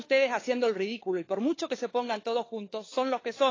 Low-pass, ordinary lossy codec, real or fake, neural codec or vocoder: 7.2 kHz; AAC, 32 kbps; fake; codec, 16 kHz, 4 kbps, FunCodec, trained on LibriTTS, 50 frames a second